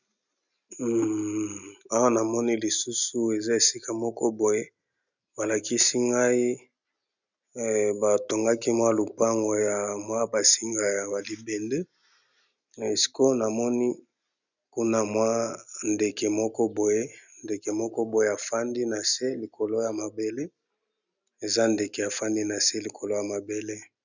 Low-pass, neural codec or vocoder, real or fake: 7.2 kHz; none; real